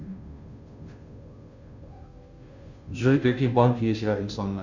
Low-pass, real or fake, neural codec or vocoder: 7.2 kHz; fake; codec, 16 kHz, 0.5 kbps, FunCodec, trained on Chinese and English, 25 frames a second